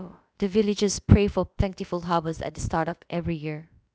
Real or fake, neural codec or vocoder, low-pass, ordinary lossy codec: fake; codec, 16 kHz, about 1 kbps, DyCAST, with the encoder's durations; none; none